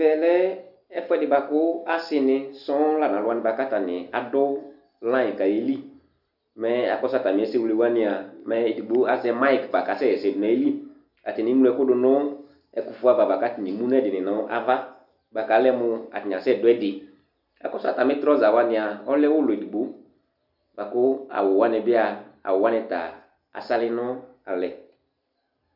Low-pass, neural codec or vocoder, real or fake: 5.4 kHz; none; real